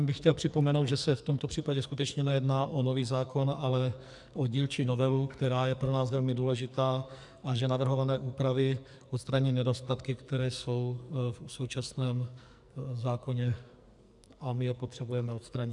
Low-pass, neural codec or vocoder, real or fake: 10.8 kHz; codec, 44.1 kHz, 2.6 kbps, SNAC; fake